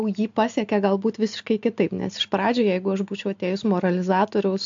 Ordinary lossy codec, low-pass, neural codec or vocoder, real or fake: AAC, 64 kbps; 7.2 kHz; none; real